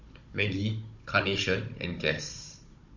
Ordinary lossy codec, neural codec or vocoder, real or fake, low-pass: MP3, 48 kbps; codec, 16 kHz, 16 kbps, FunCodec, trained on Chinese and English, 50 frames a second; fake; 7.2 kHz